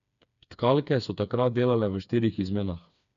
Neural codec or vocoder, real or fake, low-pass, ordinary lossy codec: codec, 16 kHz, 4 kbps, FreqCodec, smaller model; fake; 7.2 kHz; none